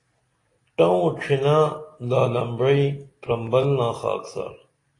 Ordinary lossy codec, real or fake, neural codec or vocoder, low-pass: AAC, 32 kbps; real; none; 10.8 kHz